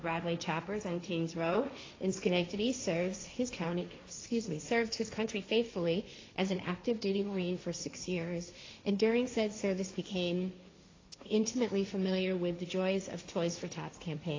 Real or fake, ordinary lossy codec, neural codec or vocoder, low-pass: fake; AAC, 32 kbps; codec, 16 kHz, 1.1 kbps, Voila-Tokenizer; 7.2 kHz